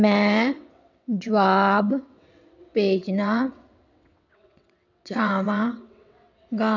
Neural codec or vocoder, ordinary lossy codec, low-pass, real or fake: vocoder, 22.05 kHz, 80 mel bands, WaveNeXt; none; 7.2 kHz; fake